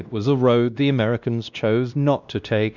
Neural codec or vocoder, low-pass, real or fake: codec, 16 kHz, 1 kbps, X-Codec, WavLM features, trained on Multilingual LibriSpeech; 7.2 kHz; fake